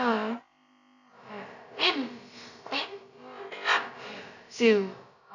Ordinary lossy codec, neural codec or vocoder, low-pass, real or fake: none; codec, 16 kHz, about 1 kbps, DyCAST, with the encoder's durations; 7.2 kHz; fake